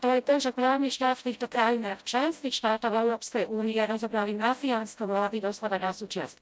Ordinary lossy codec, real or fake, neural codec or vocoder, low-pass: none; fake; codec, 16 kHz, 0.5 kbps, FreqCodec, smaller model; none